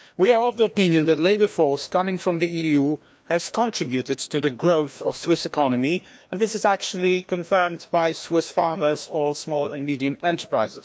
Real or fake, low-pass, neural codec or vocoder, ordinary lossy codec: fake; none; codec, 16 kHz, 1 kbps, FreqCodec, larger model; none